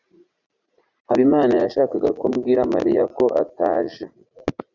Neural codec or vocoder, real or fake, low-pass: vocoder, 44.1 kHz, 80 mel bands, Vocos; fake; 7.2 kHz